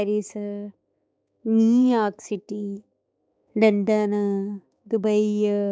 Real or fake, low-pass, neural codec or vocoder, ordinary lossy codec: fake; none; codec, 16 kHz, 4 kbps, X-Codec, HuBERT features, trained on balanced general audio; none